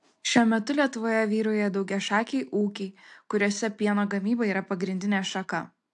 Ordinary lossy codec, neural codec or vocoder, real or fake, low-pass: AAC, 64 kbps; none; real; 10.8 kHz